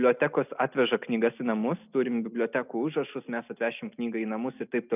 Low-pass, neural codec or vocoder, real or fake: 3.6 kHz; none; real